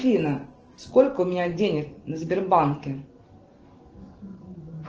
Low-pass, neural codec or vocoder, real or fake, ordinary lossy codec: 7.2 kHz; none; real; Opus, 16 kbps